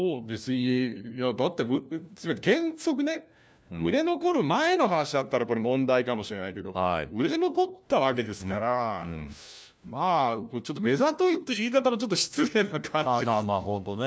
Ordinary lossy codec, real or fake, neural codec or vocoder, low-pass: none; fake; codec, 16 kHz, 1 kbps, FunCodec, trained on LibriTTS, 50 frames a second; none